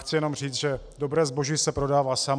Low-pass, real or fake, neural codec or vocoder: 9.9 kHz; real; none